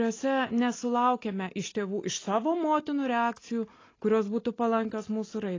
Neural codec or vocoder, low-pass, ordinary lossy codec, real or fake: none; 7.2 kHz; AAC, 32 kbps; real